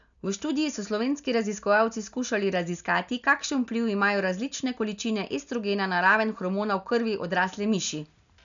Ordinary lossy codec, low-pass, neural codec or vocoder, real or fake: none; 7.2 kHz; none; real